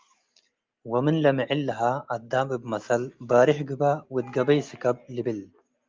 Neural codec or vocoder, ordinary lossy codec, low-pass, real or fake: none; Opus, 24 kbps; 7.2 kHz; real